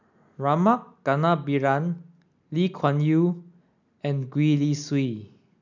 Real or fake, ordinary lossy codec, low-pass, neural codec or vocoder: real; none; 7.2 kHz; none